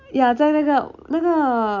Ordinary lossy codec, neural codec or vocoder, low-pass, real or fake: none; none; 7.2 kHz; real